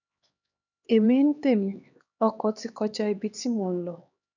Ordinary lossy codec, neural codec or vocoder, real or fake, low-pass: none; codec, 16 kHz, 2 kbps, X-Codec, HuBERT features, trained on LibriSpeech; fake; 7.2 kHz